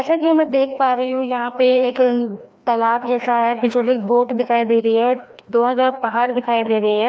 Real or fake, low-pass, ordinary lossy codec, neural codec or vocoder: fake; none; none; codec, 16 kHz, 1 kbps, FreqCodec, larger model